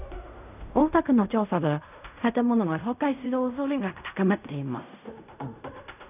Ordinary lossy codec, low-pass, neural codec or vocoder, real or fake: none; 3.6 kHz; codec, 16 kHz in and 24 kHz out, 0.4 kbps, LongCat-Audio-Codec, fine tuned four codebook decoder; fake